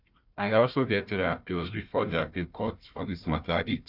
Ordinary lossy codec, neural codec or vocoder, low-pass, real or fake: none; codec, 16 kHz, 1 kbps, FunCodec, trained on Chinese and English, 50 frames a second; 5.4 kHz; fake